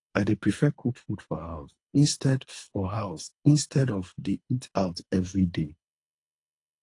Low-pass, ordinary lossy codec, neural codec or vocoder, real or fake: 10.8 kHz; AAC, 48 kbps; codec, 24 kHz, 3 kbps, HILCodec; fake